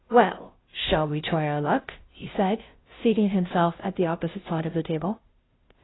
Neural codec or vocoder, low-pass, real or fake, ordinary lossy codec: codec, 16 kHz, 0.5 kbps, FunCodec, trained on Chinese and English, 25 frames a second; 7.2 kHz; fake; AAC, 16 kbps